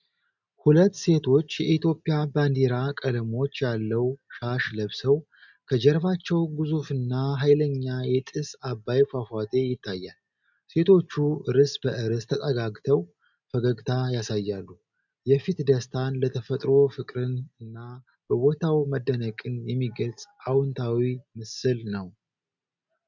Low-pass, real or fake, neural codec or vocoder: 7.2 kHz; real; none